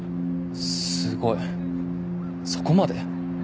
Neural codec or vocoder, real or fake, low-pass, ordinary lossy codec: none; real; none; none